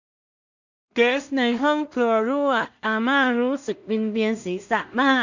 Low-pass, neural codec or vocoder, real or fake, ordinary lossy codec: 7.2 kHz; codec, 16 kHz in and 24 kHz out, 0.4 kbps, LongCat-Audio-Codec, two codebook decoder; fake; none